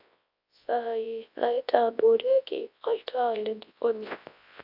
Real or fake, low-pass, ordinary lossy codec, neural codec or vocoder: fake; 5.4 kHz; AAC, 48 kbps; codec, 24 kHz, 0.9 kbps, WavTokenizer, large speech release